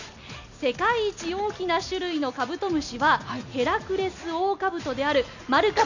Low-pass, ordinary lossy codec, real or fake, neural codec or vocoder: 7.2 kHz; none; real; none